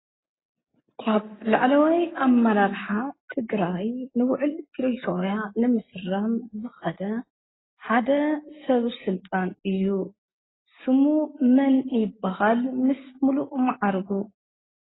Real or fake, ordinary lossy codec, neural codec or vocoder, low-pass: real; AAC, 16 kbps; none; 7.2 kHz